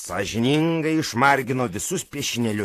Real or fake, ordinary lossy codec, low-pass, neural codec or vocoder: fake; AAC, 64 kbps; 14.4 kHz; vocoder, 44.1 kHz, 128 mel bands, Pupu-Vocoder